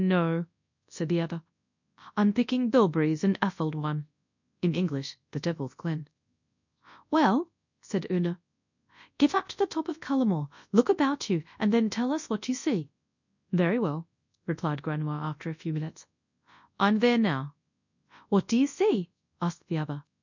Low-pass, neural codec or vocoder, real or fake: 7.2 kHz; codec, 24 kHz, 0.9 kbps, WavTokenizer, large speech release; fake